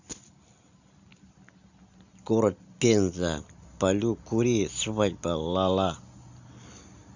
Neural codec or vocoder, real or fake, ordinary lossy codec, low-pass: none; real; none; 7.2 kHz